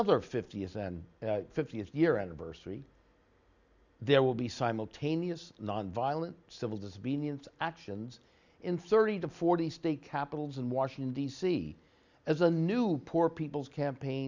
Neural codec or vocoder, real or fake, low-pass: none; real; 7.2 kHz